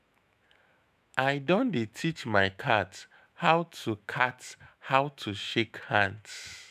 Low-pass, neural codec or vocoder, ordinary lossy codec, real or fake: 14.4 kHz; autoencoder, 48 kHz, 128 numbers a frame, DAC-VAE, trained on Japanese speech; none; fake